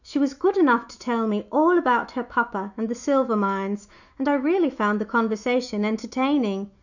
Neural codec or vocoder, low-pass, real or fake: autoencoder, 48 kHz, 128 numbers a frame, DAC-VAE, trained on Japanese speech; 7.2 kHz; fake